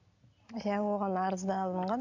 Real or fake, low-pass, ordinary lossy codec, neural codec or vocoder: real; 7.2 kHz; none; none